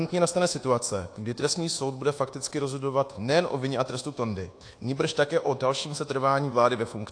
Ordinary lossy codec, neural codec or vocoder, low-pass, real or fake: AAC, 48 kbps; codec, 24 kHz, 1.2 kbps, DualCodec; 9.9 kHz; fake